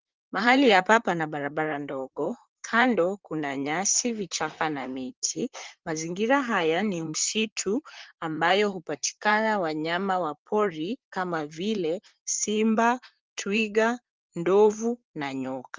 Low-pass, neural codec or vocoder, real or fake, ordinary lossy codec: 7.2 kHz; vocoder, 44.1 kHz, 128 mel bands, Pupu-Vocoder; fake; Opus, 32 kbps